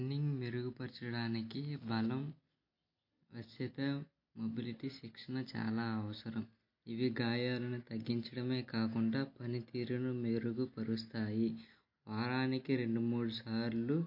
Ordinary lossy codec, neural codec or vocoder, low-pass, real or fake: MP3, 32 kbps; none; 5.4 kHz; real